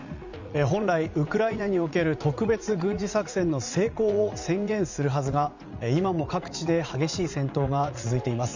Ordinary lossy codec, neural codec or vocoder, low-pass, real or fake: Opus, 64 kbps; vocoder, 44.1 kHz, 80 mel bands, Vocos; 7.2 kHz; fake